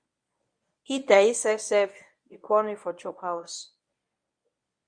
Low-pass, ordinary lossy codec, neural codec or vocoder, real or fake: 9.9 kHz; Opus, 64 kbps; codec, 24 kHz, 0.9 kbps, WavTokenizer, medium speech release version 1; fake